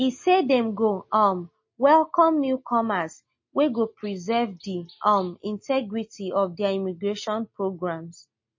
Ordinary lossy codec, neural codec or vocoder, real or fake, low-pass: MP3, 32 kbps; none; real; 7.2 kHz